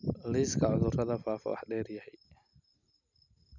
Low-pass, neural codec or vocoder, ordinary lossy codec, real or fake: 7.2 kHz; none; none; real